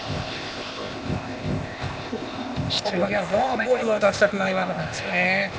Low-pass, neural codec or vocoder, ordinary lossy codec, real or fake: none; codec, 16 kHz, 0.8 kbps, ZipCodec; none; fake